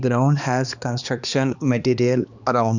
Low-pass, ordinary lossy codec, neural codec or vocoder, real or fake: 7.2 kHz; none; codec, 16 kHz, 2 kbps, X-Codec, HuBERT features, trained on balanced general audio; fake